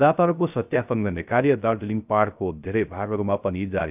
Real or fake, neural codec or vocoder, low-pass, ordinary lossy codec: fake; codec, 16 kHz, 0.3 kbps, FocalCodec; 3.6 kHz; none